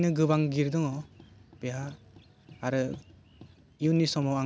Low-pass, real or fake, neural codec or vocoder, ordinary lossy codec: none; real; none; none